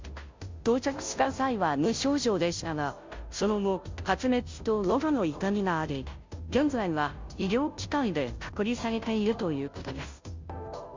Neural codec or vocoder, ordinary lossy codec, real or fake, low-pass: codec, 16 kHz, 0.5 kbps, FunCodec, trained on Chinese and English, 25 frames a second; MP3, 64 kbps; fake; 7.2 kHz